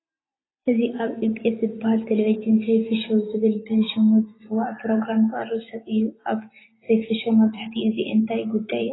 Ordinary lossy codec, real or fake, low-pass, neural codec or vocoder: AAC, 16 kbps; real; 7.2 kHz; none